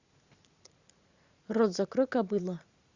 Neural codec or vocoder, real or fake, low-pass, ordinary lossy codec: vocoder, 44.1 kHz, 80 mel bands, Vocos; fake; 7.2 kHz; Opus, 64 kbps